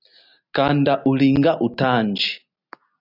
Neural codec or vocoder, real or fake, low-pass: none; real; 5.4 kHz